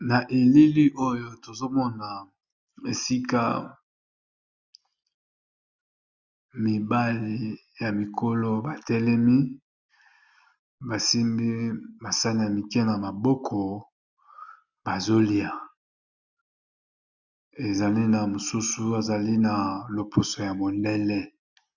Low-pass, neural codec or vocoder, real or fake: 7.2 kHz; none; real